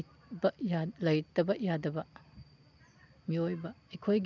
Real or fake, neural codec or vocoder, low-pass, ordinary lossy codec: real; none; 7.2 kHz; none